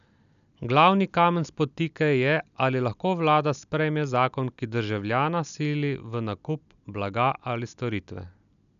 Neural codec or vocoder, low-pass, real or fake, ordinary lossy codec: none; 7.2 kHz; real; none